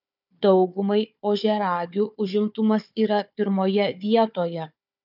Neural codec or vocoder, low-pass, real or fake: codec, 16 kHz, 4 kbps, FunCodec, trained on Chinese and English, 50 frames a second; 5.4 kHz; fake